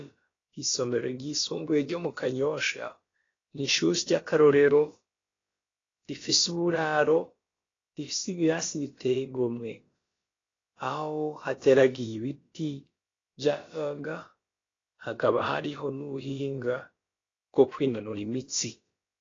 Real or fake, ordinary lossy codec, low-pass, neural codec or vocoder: fake; AAC, 32 kbps; 7.2 kHz; codec, 16 kHz, about 1 kbps, DyCAST, with the encoder's durations